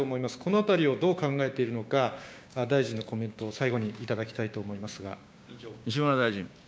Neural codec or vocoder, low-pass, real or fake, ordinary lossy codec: codec, 16 kHz, 6 kbps, DAC; none; fake; none